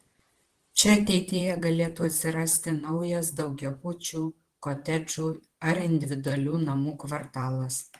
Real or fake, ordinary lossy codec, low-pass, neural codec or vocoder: fake; Opus, 16 kbps; 14.4 kHz; vocoder, 44.1 kHz, 128 mel bands, Pupu-Vocoder